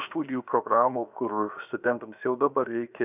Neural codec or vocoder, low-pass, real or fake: codec, 16 kHz, 0.7 kbps, FocalCodec; 3.6 kHz; fake